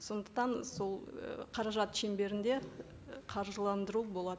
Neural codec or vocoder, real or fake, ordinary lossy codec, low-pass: none; real; none; none